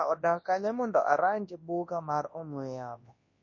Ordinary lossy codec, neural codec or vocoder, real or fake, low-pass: MP3, 32 kbps; codec, 24 kHz, 0.9 kbps, WavTokenizer, large speech release; fake; 7.2 kHz